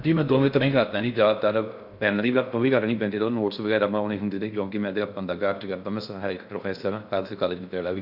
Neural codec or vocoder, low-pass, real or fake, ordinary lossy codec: codec, 16 kHz in and 24 kHz out, 0.6 kbps, FocalCodec, streaming, 4096 codes; 5.4 kHz; fake; none